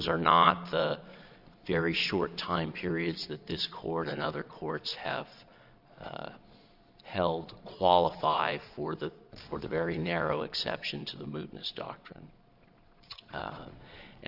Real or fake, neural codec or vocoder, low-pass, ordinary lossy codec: fake; vocoder, 22.05 kHz, 80 mel bands, Vocos; 5.4 kHz; Opus, 64 kbps